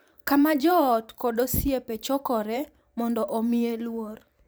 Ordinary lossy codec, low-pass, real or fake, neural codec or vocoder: none; none; fake; vocoder, 44.1 kHz, 128 mel bands every 512 samples, BigVGAN v2